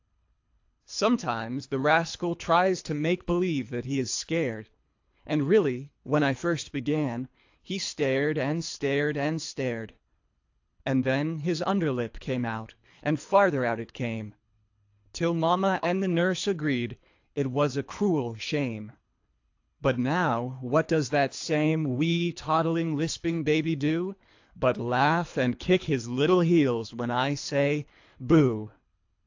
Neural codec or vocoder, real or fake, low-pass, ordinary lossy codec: codec, 24 kHz, 3 kbps, HILCodec; fake; 7.2 kHz; AAC, 48 kbps